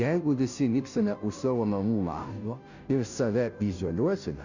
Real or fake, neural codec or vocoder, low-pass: fake; codec, 16 kHz, 0.5 kbps, FunCodec, trained on Chinese and English, 25 frames a second; 7.2 kHz